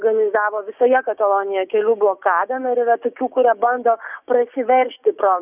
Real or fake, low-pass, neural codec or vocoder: fake; 3.6 kHz; codec, 16 kHz, 6 kbps, DAC